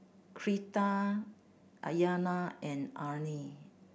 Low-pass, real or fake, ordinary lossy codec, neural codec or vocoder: none; real; none; none